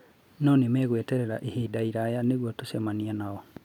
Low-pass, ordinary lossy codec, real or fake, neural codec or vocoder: 19.8 kHz; none; real; none